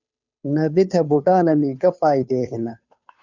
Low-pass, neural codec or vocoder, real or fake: 7.2 kHz; codec, 16 kHz, 2 kbps, FunCodec, trained on Chinese and English, 25 frames a second; fake